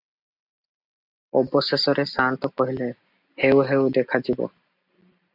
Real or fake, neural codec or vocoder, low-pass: real; none; 5.4 kHz